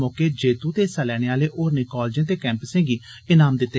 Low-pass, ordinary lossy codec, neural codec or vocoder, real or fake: none; none; none; real